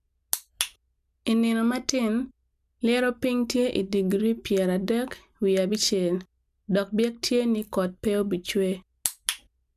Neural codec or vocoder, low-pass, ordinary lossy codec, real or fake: none; 14.4 kHz; none; real